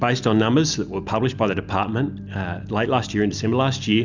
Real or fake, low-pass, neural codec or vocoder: real; 7.2 kHz; none